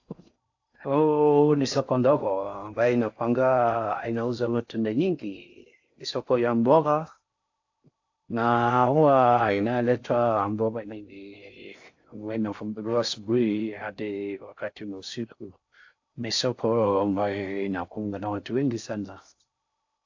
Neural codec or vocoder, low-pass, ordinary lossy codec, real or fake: codec, 16 kHz in and 24 kHz out, 0.6 kbps, FocalCodec, streaming, 4096 codes; 7.2 kHz; AAC, 48 kbps; fake